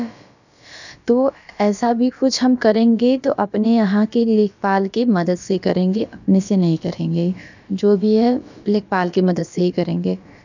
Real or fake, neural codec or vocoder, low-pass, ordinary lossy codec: fake; codec, 16 kHz, about 1 kbps, DyCAST, with the encoder's durations; 7.2 kHz; none